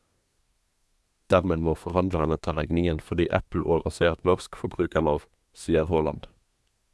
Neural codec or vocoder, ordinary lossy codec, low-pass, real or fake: codec, 24 kHz, 1 kbps, SNAC; none; none; fake